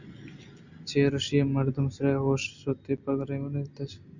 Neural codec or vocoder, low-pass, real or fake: none; 7.2 kHz; real